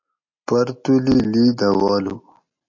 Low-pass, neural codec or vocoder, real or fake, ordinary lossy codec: 7.2 kHz; none; real; MP3, 48 kbps